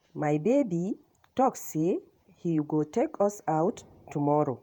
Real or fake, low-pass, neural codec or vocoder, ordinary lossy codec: fake; none; vocoder, 48 kHz, 128 mel bands, Vocos; none